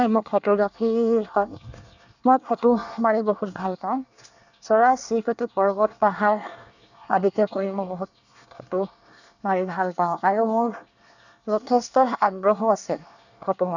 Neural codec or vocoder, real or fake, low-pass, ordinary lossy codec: codec, 24 kHz, 1 kbps, SNAC; fake; 7.2 kHz; none